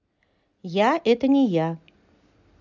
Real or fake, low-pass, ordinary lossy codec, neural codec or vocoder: real; 7.2 kHz; none; none